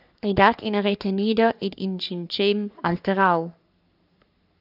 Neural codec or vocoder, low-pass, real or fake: codec, 44.1 kHz, 3.4 kbps, Pupu-Codec; 5.4 kHz; fake